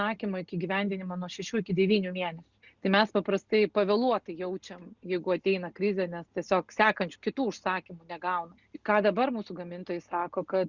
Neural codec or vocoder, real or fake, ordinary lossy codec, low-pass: none; real; Opus, 64 kbps; 7.2 kHz